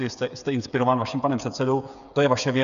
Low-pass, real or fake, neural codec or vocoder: 7.2 kHz; fake; codec, 16 kHz, 8 kbps, FreqCodec, smaller model